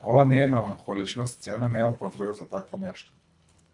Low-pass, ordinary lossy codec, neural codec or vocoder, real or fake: 10.8 kHz; MP3, 96 kbps; codec, 24 kHz, 1.5 kbps, HILCodec; fake